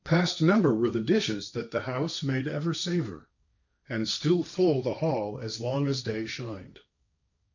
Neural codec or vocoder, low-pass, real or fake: codec, 16 kHz, 1.1 kbps, Voila-Tokenizer; 7.2 kHz; fake